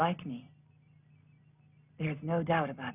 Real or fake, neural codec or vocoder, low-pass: real; none; 3.6 kHz